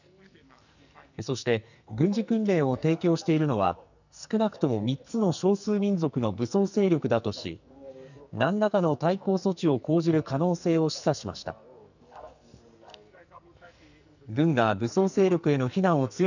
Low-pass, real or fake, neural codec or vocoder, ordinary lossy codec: 7.2 kHz; fake; codec, 44.1 kHz, 2.6 kbps, SNAC; none